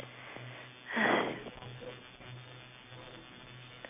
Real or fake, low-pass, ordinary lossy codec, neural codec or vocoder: real; 3.6 kHz; none; none